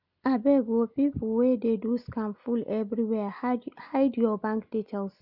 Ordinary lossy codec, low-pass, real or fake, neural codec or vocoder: none; 5.4 kHz; real; none